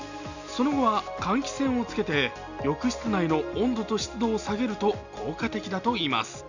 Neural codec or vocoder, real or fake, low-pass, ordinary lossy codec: none; real; 7.2 kHz; none